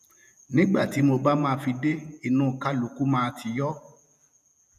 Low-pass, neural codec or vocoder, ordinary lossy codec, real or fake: 14.4 kHz; vocoder, 48 kHz, 128 mel bands, Vocos; none; fake